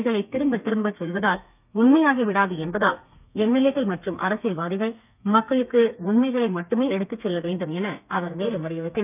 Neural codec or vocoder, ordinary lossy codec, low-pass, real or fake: codec, 32 kHz, 1.9 kbps, SNAC; none; 3.6 kHz; fake